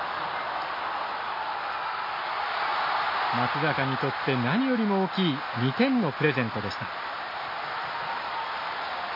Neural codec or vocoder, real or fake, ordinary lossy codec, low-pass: none; real; MP3, 24 kbps; 5.4 kHz